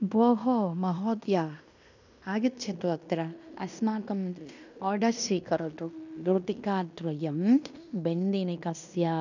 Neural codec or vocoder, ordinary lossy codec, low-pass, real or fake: codec, 16 kHz in and 24 kHz out, 0.9 kbps, LongCat-Audio-Codec, fine tuned four codebook decoder; none; 7.2 kHz; fake